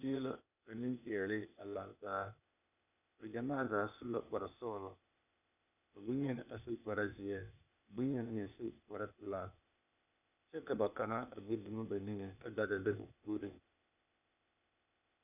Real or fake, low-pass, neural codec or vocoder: fake; 3.6 kHz; codec, 16 kHz, 0.8 kbps, ZipCodec